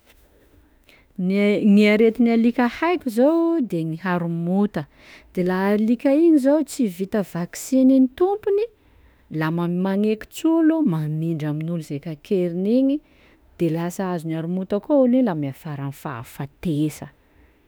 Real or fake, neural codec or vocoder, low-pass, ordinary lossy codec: fake; autoencoder, 48 kHz, 32 numbers a frame, DAC-VAE, trained on Japanese speech; none; none